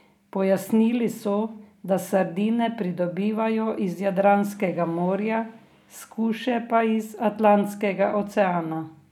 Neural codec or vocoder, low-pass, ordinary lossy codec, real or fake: none; 19.8 kHz; none; real